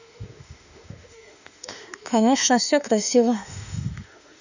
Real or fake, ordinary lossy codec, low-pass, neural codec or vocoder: fake; none; 7.2 kHz; autoencoder, 48 kHz, 32 numbers a frame, DAC-VAE, trained on Japanese speech